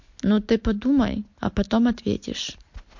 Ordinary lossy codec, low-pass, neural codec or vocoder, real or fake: MP3, 48 kbps; 7.2 kHz; none; real